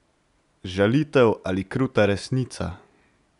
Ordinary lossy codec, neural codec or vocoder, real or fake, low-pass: none; none; real; 10.8 kHz